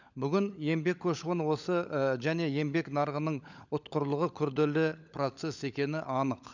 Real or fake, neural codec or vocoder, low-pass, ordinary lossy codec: fake; codec, 16 kHz, 16 kbps, FunCodec, trained on LibriTTS, 50 frames a second; 7.2 kHz; none